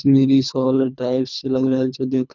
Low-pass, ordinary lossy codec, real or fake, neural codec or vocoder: 7.2 kHz; none; fake; codec, 24 kHz, 3 kbps, HILCodec